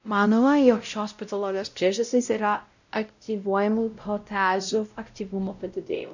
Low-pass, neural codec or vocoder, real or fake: 7.2 kHz; codec, 16 kHz, 0.5 kbps, X-Codec, WavLM features, trained on Multilingual LibriSpeech; fake